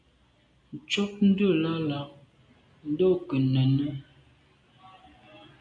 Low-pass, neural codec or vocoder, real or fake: 9.9 kHz; none; real